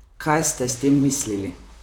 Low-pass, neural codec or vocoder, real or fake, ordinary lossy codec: 19.8 kHz; vocoder, 44.1 kHz, 128 mel bands, Pupu-Vocoder; fake; none